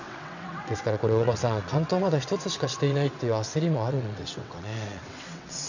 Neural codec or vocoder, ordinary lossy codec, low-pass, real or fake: vocoder, 22.05 kHz, 80 mel bands, WaveNeXt; none; 7.2 kHz; fake